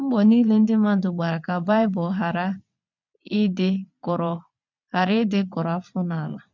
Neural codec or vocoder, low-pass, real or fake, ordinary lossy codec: vocoder, 22.05 kHz, 80 mel bands, WaveNeXt; 7.2 kHz; fake; MP3, 64 kbps